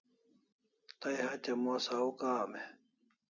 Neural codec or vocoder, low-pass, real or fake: none; 7.2 kHz; real